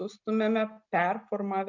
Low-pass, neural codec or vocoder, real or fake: 7.2 kHz; none; real